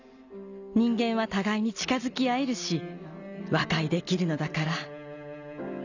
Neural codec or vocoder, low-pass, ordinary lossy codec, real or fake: none; 7.2 kHz; none; real